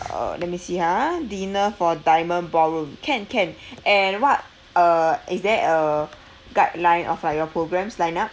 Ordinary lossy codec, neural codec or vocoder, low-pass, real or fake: none; none; none; real